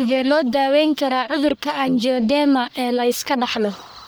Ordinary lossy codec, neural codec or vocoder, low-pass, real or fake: none; codec, 44.1 kHz, 1.7 kbps, Pupu-Codec; none; fake